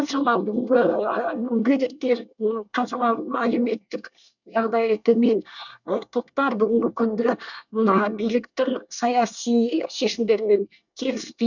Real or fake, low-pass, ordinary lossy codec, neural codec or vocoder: fake; 7.2 kHz; none; codec, 24 kHz, 1 kbps, SNAC